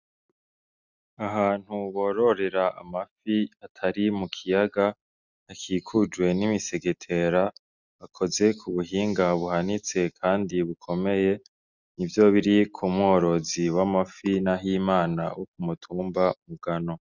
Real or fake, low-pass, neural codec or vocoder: real; 7.2 kHz; none